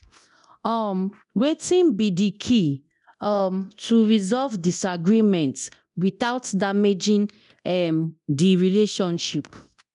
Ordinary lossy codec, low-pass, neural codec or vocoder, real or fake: none; 10.8 kHz; codec, 24 kHz, 0.9 kbps, DualCodec; fake